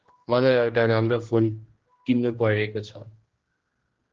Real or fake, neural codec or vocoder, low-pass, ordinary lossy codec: fake; codec, 16 kHz, 1 kbps, X-Codec, HuBERT features, trained on general audio; 7.2 kHz; Opus, 16 kbps